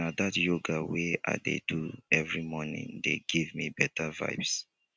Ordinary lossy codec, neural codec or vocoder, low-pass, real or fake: none; none; none; real